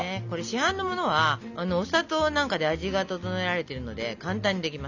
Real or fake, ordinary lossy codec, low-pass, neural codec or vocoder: real; none; 7.2 kHz; none